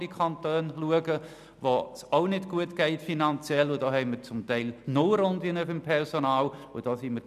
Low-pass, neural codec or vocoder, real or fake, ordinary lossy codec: 14.4 kHz; none; real; none